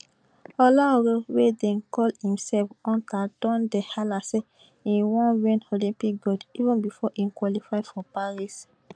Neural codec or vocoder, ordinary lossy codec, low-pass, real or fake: none; none; 9.9 kHz; real